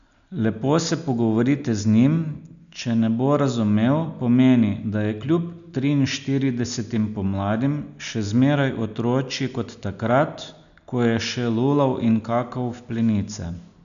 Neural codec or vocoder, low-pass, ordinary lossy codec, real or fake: none; 7.2 kHz; none; real